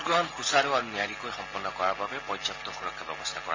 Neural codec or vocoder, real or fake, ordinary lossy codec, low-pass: none; real; AAC, 32 kbps; 7.2 kHz